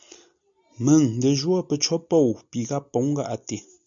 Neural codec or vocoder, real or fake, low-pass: none; real; 7.2 kHz